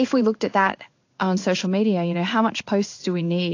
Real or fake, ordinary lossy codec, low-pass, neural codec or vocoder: fake; AAC, 48 kbps; 7.2 kHz; codec, 16 kHz in and 24 kHz out, 1 kbps, XY-Tokenizer